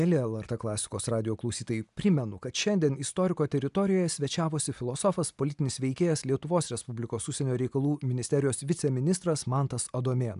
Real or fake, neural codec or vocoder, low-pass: real; none; 10.8 kHz